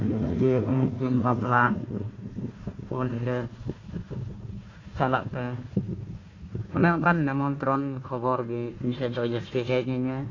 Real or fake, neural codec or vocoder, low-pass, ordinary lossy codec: fake; codec, 16 kHz, 1 kbps, FunCodec, trained on Chinese and English, 50 frames a second; 7.2 kHz; AAC, 32 kbps